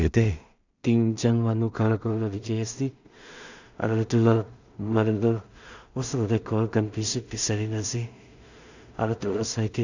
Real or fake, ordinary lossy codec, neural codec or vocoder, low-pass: fake; none; codec, 16 kHz in and 24 kHz out, 0.4 kbps, LongCat-Audio-Codec, two codebook decoder; 7.2 kHz